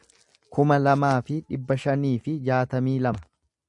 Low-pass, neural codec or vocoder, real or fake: 10.8 kHz; none; real